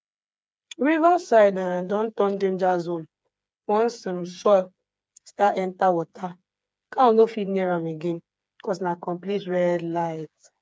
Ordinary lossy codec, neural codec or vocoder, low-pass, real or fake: none; codec, 16 kHz, 4 kbps, FreqCodec, smaller model; none; fake